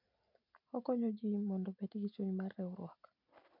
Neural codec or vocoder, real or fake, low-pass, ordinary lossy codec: none; real; 5.4 kHz; MP3, 48 kbps